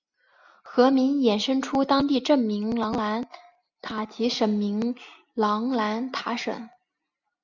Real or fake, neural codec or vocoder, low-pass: real; none; 7.2 kHz